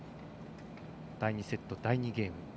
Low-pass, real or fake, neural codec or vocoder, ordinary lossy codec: none; real; none; none